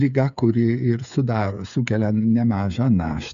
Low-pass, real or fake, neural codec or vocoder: 7.2 kHz; fake; codec, 16 kHz, 8 kbps, FreqCodec, smaller model